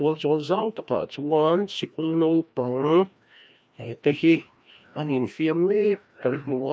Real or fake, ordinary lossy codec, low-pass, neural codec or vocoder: fake; none; none; codec, 16 kHz, 1 kbps, FreqCodec, larger model